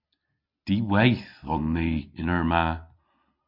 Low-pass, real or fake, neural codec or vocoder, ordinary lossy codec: 5.4 kHz; real; none; MP3, 48 kbps